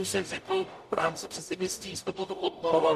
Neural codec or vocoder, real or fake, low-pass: codec, 44.1 kHz, 0.9 kbps, DAC; fake; 14.4 kHz